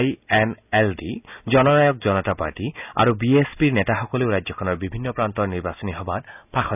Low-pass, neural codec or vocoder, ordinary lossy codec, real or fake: 3.6 kHz; none; none; real